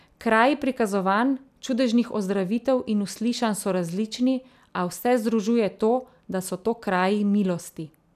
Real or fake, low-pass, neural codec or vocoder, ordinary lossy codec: real; 14.4 kHz; none; none